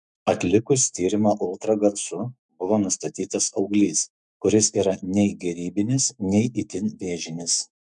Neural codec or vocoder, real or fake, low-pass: autoencoder, 48 kHz, 128 numbers a frame, DAC-VAE, trained on Japanese speech; fake; 10.8 kHz